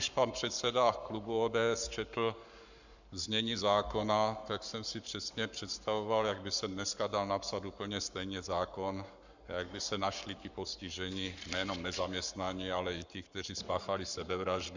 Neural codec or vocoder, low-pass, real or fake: codec, 44.1 kHz, 7.8 kbps, Pupu-Codec; 7.2 kHz; fake